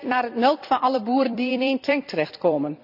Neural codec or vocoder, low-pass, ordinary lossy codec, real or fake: vocoder, 44.1 kHz, 128 mel bands every 256 samples, BigVGAN v2; 5.4 kHz; none; fake